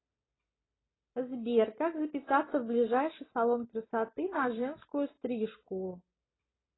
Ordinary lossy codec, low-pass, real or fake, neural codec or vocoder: AAC, 16 kbps; 7.2 kHz; real; none